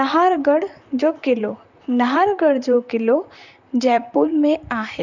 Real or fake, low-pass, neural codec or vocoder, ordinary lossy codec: fake; 7.2 kHz; vocoder, 44.1 kHz, 128 mel bands, Pupu-Vocoder; none